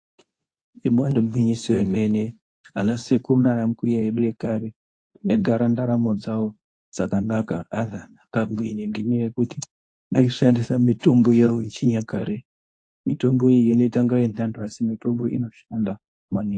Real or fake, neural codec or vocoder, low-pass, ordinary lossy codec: fake; codec, 24 kHz, 0.9 kbps, WavTokenizer, medium speech release version 2; 9.9 kHz; AAC, 48 kbps